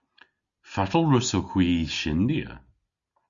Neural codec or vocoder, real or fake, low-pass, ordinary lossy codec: none; real; 7.2 kHz; Opus, 64 kbps